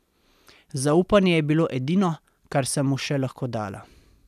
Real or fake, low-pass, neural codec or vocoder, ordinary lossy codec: real; 14.4 kHz; none; none